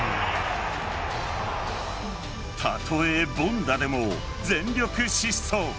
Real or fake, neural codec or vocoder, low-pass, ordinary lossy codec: real; none; none; none